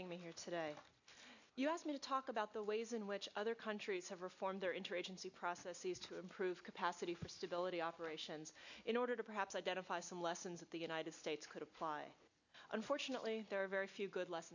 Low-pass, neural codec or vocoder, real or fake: 7.2 kHz; none; real